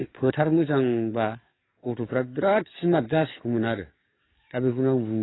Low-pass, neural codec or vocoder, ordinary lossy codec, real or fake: 7.2 kHz; codec, 44.1 kHz, 7.8 kbps, DAC; AAC, 16 kbps; fake